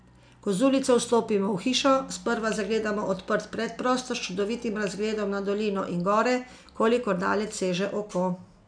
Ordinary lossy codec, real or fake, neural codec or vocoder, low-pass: none; real; none; 9.9 kHz